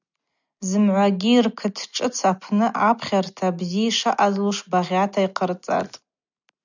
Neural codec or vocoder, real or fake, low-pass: none; real; 7.2 kHz